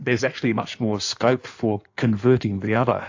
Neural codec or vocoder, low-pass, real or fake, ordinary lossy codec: codec, 16 kHz in and 24 kHz out, 1.1 kbps, FireRedTTS-2 codec; 7.2 kHz; fake; AAC, 48 kbps